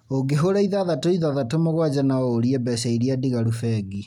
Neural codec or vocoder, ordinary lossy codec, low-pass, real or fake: none; none; 19.8 kHz; real